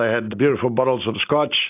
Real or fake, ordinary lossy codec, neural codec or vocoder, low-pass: real; AAC, 32 kbps; none; 3.6 kHz